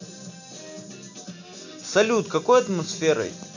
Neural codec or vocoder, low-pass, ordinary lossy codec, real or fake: none; 7.2 kHz; none; real